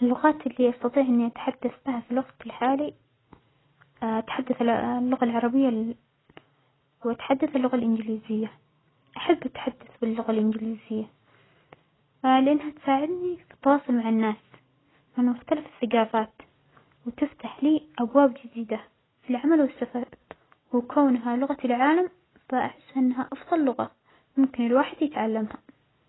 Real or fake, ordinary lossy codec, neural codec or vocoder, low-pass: real; AAC, 16 kbps; none; 7.2 kHz